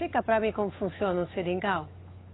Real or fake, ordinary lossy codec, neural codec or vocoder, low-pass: fake; AAC, 16 kbps; codec, 16 kHz, 8 kbps, FunCodec, trained on Chinese and English, 25 frames a second; 7.2 kHz